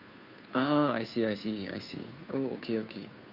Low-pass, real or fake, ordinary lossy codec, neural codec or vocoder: 5.4 kHz; fake; none; codec, 16 kHz, 2 kbps, FunCodec, trained on Chinese and English, 25 frames a second